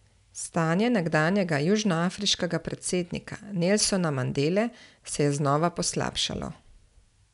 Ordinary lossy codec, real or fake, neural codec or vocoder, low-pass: none; real; none; 10.8 kHz